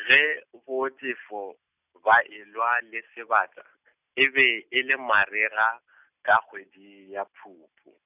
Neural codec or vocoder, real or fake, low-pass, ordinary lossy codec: none; real; 3.6 kHz; none